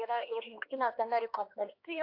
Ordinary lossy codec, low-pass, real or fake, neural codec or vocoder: AAC, 32 kbps; 5.4 kHz; fake; codec, 16 kHz, 2 kbps, X-Codec, HuBERT features, trained on LibriSpeech